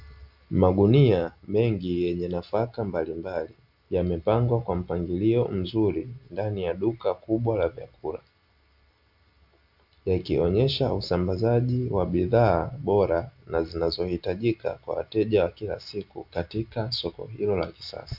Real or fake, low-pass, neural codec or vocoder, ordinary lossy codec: real; 5.4 kHz; none; AAC, 48 kbps